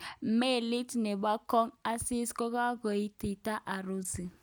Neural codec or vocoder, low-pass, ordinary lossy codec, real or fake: none; none; none; real